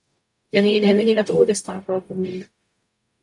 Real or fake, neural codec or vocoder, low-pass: fake; codec, 44.1 kHz, 0.9 kbps, DAC; 10.8 kHz